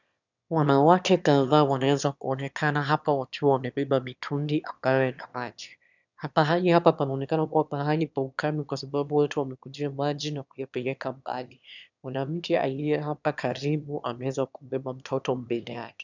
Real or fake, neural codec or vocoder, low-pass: fake; autoencoder, 22.05 kHz, a latent of 192 numbers a frame, VITS, trained on one speaker; 7.2 kHz